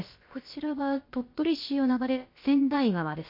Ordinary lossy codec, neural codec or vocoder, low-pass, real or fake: MP3, 32 kbps; codec, 16 kHz, about 1 kbps, DyCAST, with the encoder's durations; 5.4 kHz; fake